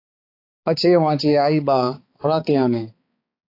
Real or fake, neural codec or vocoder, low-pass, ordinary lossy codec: fake; codec, 16 kHz, 4 kbps, X-Codec, HuBERT features, trained on balanced general audio; 5.4 kHz; AAC, 32 kbps